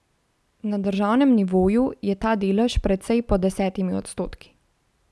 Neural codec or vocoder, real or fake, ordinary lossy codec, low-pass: none; real; none; none